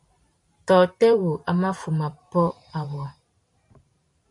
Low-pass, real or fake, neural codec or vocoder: 10.8 kHz; fake; vocoder, 44.1 kHz, 128 mel bands every 512 samples, BigVGAN v2